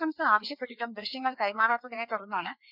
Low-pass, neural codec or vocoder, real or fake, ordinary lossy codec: 5.4 kHz; codec, 16 kHz, 2 kbps, FreqCodec, larger model; fake; none